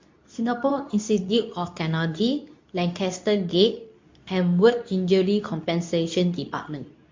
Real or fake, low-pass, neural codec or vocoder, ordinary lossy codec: fake; 7.2 kHz; codec, 24 kHz, 0.9 kbps, WavTokenizer, medium speech release version 2; MP3, 48 kbps